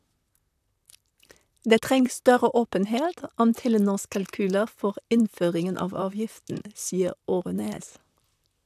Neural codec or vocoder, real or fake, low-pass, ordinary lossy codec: vocoder, 44.1 kHz, 128 mel bands, Pupu-Vocoder; fake; 14.4 kHz; none